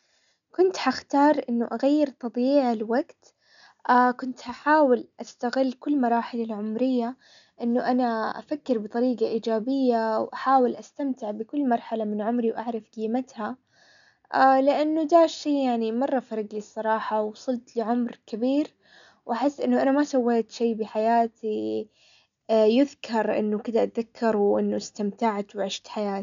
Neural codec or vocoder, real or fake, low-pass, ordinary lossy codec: none; real; 7.2 kHz; none